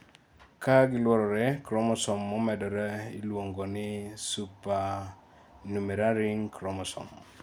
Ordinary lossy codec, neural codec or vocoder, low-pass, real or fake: none; none; none; real